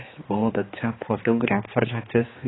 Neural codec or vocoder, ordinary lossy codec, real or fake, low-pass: codec, 16 kHz, 2 kbps, X-Codec, HuBERT features, trained on general audio; AAC, 16 kbps; fake; 7.2 kHz